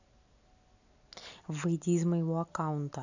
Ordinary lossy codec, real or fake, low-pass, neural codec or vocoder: none; real; 7.2 kHz; none